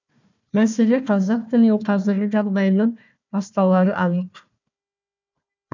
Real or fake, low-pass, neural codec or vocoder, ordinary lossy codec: fake; 7.2 kHz; codec, 16 kHz, 1 kbps, FunCodec, trained on Chinese and English, 50 frames a second; none